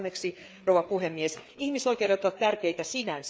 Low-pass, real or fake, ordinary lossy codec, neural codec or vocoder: none; fake; none; codec, 16 kHz, 4 kbps, FreqCodec, larger model